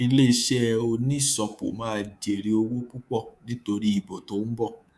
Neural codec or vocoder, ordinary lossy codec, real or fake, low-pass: codec, 24 kHz, 3.1 kbps, DualCodec; none; fake; none